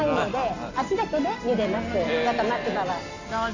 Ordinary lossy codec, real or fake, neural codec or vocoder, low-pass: none; real; none; 7.2 kHz